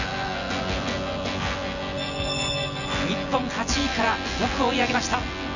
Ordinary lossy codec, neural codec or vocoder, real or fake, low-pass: none; vocoder, 24 kHz, 100 mel bands, Vocos; fake; 7.2 kHz